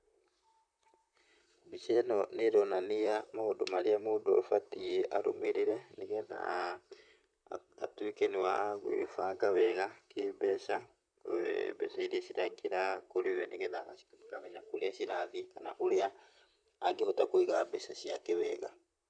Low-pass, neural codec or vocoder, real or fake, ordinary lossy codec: 9.9 kHz; vocoder, 44.1 kHz, 128 mel bands, Pupu-Vocoder; fake; none